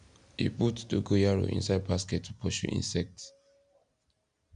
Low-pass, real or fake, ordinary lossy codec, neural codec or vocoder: 9.9 kHz; real; none; none